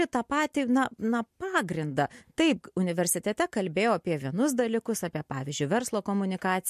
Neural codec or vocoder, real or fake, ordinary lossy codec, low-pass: none; real; MP3, 64 kbps; 14.4 kHz